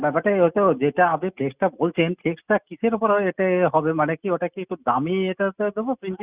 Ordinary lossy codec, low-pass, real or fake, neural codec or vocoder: Opus, 64 kbps; 3.6 kHz; real; none